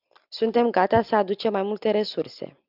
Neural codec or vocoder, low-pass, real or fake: none; 5.4 kHz; real